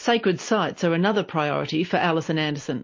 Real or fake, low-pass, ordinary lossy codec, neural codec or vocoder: real; 7.2 kHz; MP3, 32 kbps; none